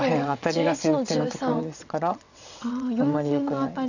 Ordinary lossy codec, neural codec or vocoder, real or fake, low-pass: none; vocoder, 22.05 kHz, 80 mel bands, WaveNeXt; fake; 7.2 kHz